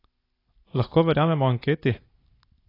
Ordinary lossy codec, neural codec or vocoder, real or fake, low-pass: AAC, 24 kbps; none; real; 5.4 kHz